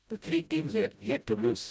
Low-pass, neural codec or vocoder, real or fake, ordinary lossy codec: none; codec, 16 kHz, 0.5 kbps, FreqCodec, smaller model; fake; none